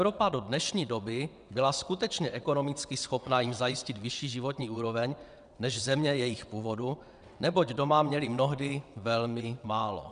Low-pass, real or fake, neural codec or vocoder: 9.9 kHz; fake; vocoder, 22.05 kHz, 80 mel bands, WaveNeXt